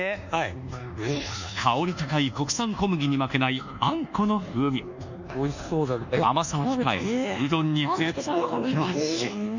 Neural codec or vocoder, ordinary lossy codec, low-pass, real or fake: codec, 24 kHz, 1.2 kbps, DualCodec; none; 7.2 kHz; fake